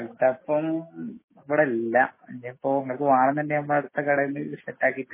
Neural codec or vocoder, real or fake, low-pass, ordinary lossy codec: autoencoder, 48 kHz, 128 numbers a frame, DAC-VAE, trained on Japanese speech; fake; 3.6 kHz; MP3, 16 kbps